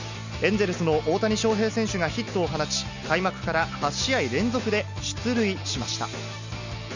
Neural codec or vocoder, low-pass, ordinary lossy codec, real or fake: none; 7.2 kHz; none; real